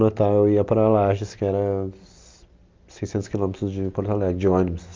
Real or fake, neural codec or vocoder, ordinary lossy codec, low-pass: real; none; Opus, 24 kbps; 7.2 kHz